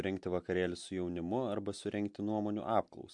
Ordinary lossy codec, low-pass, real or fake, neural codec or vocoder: MP3, 64 kbps; 10.8 kHz; real; none